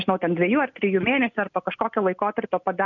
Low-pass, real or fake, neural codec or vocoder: 7.2 kHz; real; none